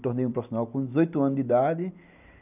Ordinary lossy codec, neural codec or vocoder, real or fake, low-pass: none; none; real; 3.6 kHz